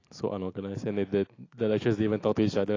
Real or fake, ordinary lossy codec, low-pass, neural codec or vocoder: real; AAC, 32 kbps; 7.2 kHz; none